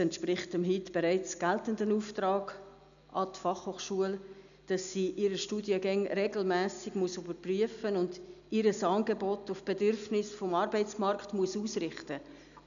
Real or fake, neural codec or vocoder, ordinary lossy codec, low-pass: real; none; none; 7.2 kHz